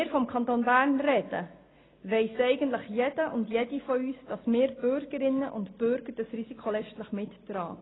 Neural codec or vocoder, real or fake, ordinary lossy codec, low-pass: none; real; AAC, 16 kbps; 7.2 kHz